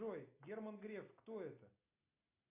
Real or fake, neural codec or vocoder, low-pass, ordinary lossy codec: real; none; 3.6 kHz; AAC, 24 kbps